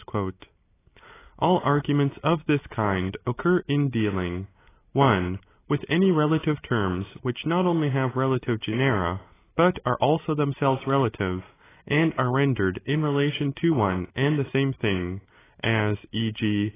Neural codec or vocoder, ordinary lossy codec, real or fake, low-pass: none; AAC, 16 kbps; real; 3.6 kHz